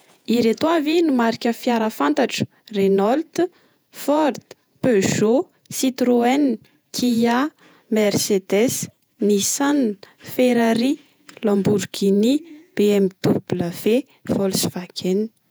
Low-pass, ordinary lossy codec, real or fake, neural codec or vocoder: none; none; fake; vocoder, 48 kHz, 128 mel bands, Vocos